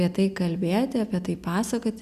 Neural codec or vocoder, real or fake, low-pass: none; real; 14.4 kHz